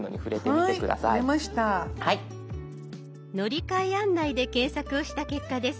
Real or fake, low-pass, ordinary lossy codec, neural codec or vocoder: real; none; none; none